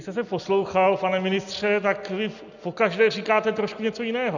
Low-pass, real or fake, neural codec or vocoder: 7.2 kHz; real; none